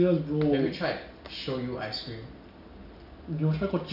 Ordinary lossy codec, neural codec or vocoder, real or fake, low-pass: none; none; real; 5.4 kHz